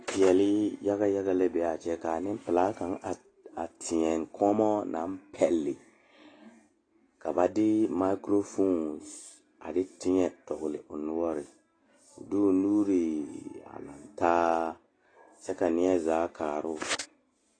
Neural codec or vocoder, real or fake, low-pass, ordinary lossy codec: none; real; 9.9 kHz; AAC, 32 kbps